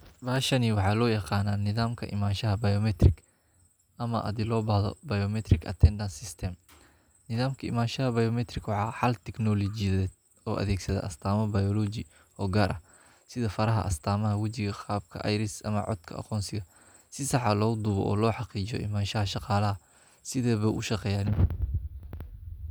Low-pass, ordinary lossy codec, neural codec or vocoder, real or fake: none; none; none; real